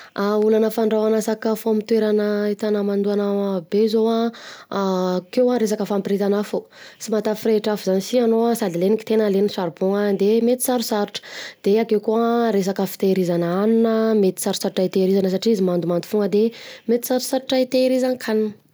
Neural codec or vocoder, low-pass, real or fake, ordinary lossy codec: none; none; real; none